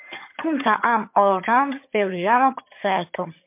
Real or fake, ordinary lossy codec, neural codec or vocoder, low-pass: fake; MP3, 32 kbps; vocoder, 22.05 kHz, 80 mel bands, HiFi-GAN; 3.6 kHz